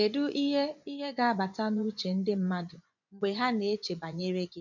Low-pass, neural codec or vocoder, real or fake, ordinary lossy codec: 7.2 kHz; vocoder, 24 kHz, 100 mel bands, Vocos; fake; none